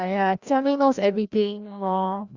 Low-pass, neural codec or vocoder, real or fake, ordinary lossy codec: 7.2 kHz; codec, 16 kHz, 1 kbps, FreqCodec, larger model; fake; Opus, 64 kbps